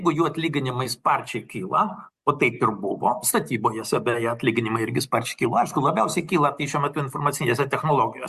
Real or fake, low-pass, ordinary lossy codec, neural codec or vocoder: real; 14.4 kHz; Opus, 64 kbps; none